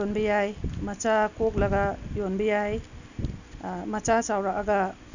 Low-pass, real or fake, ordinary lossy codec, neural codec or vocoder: 7.2 kHz; real; none; none